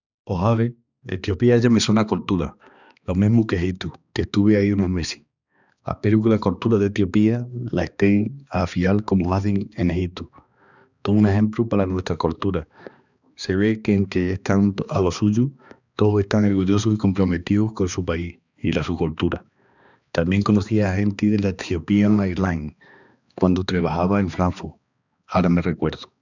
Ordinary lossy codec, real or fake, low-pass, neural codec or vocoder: none; fake; 7.2 kHz; codec, 16 kHz, 2 kbps, X-Codec, HuBERT features, trained on balanced general audio